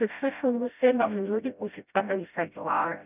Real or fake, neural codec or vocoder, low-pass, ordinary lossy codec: fake; codec, 16 kHz, 0.5 kbps, FreqCodec, smaller model; 3.6 kHz; none